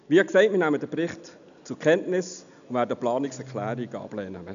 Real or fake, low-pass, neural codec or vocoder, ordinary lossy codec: real; 7.2 kHz; none; none